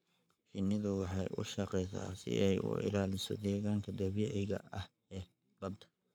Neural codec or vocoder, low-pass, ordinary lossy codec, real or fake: codec, 44.1 kHz, 7.8 kbps, Pupu-Codec; none; none; fake